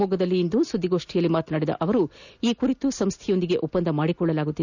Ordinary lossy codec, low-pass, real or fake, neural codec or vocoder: none; 7.2 kHz; real; none